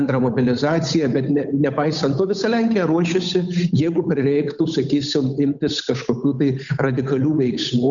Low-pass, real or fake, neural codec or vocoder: 7.2 kHz; fake; codec, 16 kHz, 8 kbps, FunCodec, trained on Chinese and English, 25 frames a second